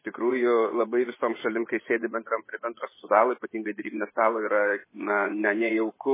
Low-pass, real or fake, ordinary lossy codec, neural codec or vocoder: 3.6 kHz; fake; MP3, 16 kbps; vocoder, 24 kHz, 100 mel bands, Vocos